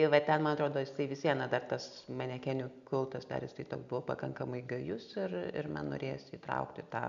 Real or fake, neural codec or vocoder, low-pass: real; none; 7.2 kHz